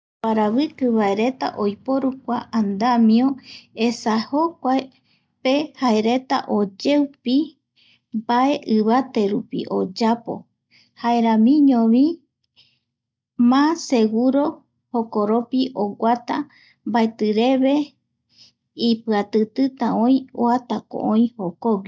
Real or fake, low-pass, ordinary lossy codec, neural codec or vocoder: real; none; none; none